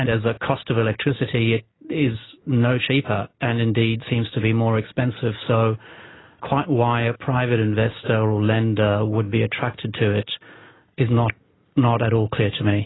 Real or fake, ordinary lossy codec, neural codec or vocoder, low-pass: real; AAC, 16 kbps; none; 7.2 kHz